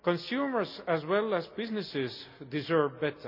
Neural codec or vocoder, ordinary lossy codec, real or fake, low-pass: none; none; real; 5.4 kHz